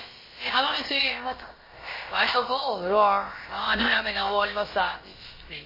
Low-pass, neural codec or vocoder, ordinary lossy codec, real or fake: 5.4 kHz; codec, 16 kHz, about 1 kbps, DyCAST, with the encoder's durations; MP3, 24 kbps; fake